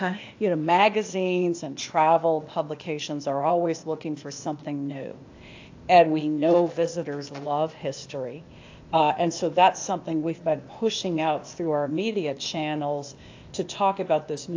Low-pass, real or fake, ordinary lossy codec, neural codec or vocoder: 7.2 kHz; fake; AAC, 48 kbps; codec, 16 kHz, 0.8 kbps, ZipCodec